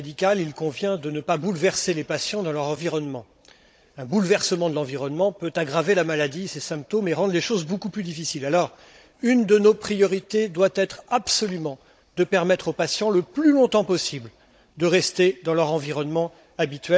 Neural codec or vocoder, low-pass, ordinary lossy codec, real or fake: codec, 16 kHz, 16 kbps, FunCodec, trained on Chinese and English, 50 frames a second; none; none; fake